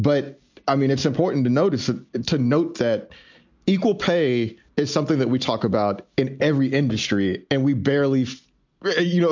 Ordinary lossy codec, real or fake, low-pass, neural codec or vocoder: MP3, 64 kbps; real; 7.2 kHz; none